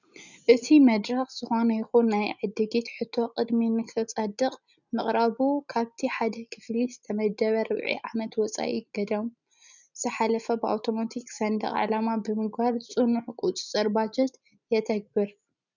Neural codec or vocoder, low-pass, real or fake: none; 7.2 kHz; real